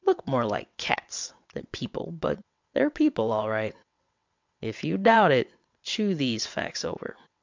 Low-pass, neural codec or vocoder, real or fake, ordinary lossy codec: 7.2 kHz; vocoder, 22.05 kHz, 80 mel bands, WaveNeXt; fake; MP3, 64 kbps